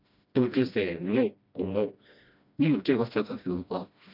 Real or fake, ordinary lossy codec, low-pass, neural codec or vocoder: fake; none; 5.4 kHz; codec, 16 kHz, 1 kbps, FreqCodec, smaller model